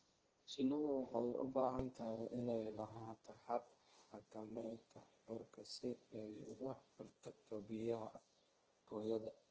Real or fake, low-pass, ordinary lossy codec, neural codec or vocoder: fake; 7.2 kHz; Opus, 16 kbps; codec, 16 kHz, 1.1 kbps, Voila-Tokenizer